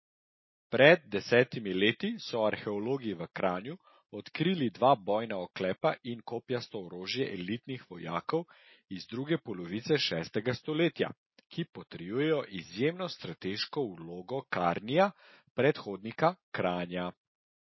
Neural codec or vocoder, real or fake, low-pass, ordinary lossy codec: none; real; 7.2 kHz; MP3, 24 kbps